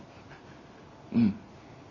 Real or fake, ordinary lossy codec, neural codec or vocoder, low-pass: real; none; none; 7.2 kHz